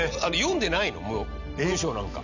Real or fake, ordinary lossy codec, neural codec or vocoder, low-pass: real; none; none; 7.2 kHz